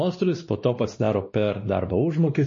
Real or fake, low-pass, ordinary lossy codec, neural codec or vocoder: fake; 7.2 kHz; MP3, 32 kbps; codec, 16 kHz, 2 kbps, X-Codec, WavLM features, trained on Multilingual LibriSpeech